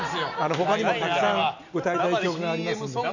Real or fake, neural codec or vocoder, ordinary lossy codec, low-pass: real; none; none; 7.2 kHz